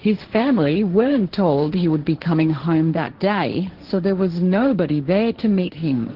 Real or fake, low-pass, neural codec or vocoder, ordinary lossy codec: fake; 5.4 kHz; codec, 16 kHz, 1.1 kbps, Voila-Tokenizer; Opus, 16 kbps